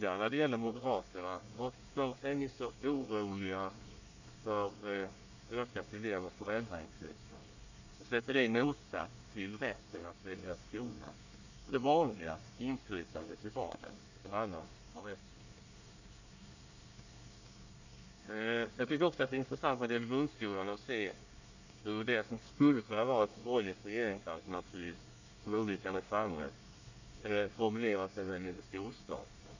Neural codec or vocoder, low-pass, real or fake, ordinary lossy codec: codec, 24 kHz, 1 kbps, SNAC; 7.2 kHz; fake; none